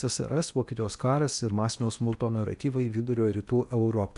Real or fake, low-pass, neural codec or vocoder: fake; 10.8 kHz; codec, 16 kHz in and 24 kHz out, 0.8 kbps, FocalCodec, streaming, 65536 codes